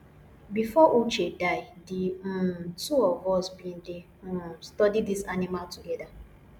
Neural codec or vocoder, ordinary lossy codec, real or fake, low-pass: none; none; real; 19.8 kHz